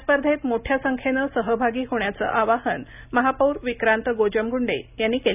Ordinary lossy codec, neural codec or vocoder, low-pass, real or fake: none; none; 3.6 kHz; real